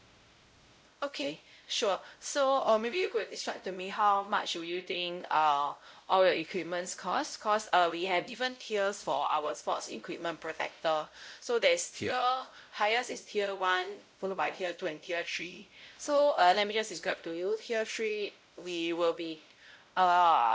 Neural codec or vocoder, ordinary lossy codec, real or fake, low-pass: codec, 16 kHz, 0.5 kbps, X-Codec, WavLM features, trained on Multilingual LibriSpeech; none; fake; none